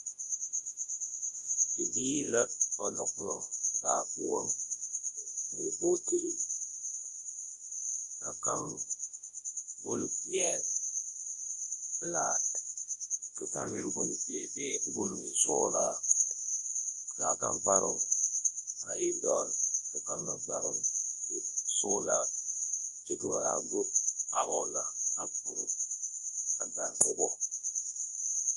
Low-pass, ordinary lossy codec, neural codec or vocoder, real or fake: 10.8 kHz; Opus, 32 kbps; codec, 24 kHz, 0.9 kbps, WavTokenizer, large speech release; fake